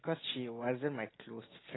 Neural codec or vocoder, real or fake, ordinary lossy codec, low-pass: none; real; AAC, 16 kbps; 7.2 kHz